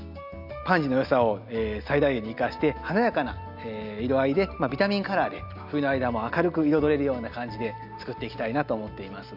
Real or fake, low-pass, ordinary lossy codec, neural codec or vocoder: real; 5.4 kHz; none; none